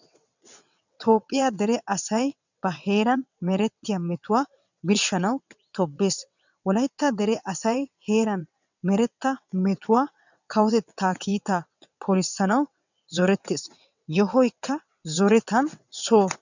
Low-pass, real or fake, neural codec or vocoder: 7.2 kHz; fake; vocoder, 22.05 kHz, 80 mel bands, WaveNeXt